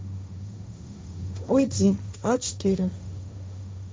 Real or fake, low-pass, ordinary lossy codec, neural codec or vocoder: fake; none; none; codec, 16 kHz, 1.1 kbps, Voila-Tokenizer